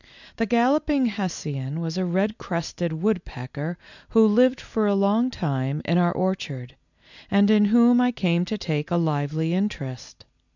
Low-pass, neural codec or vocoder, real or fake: 7.2 kHz; none; real